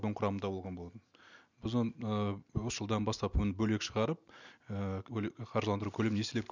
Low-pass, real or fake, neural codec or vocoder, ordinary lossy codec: 7.2 kHz; real; none; none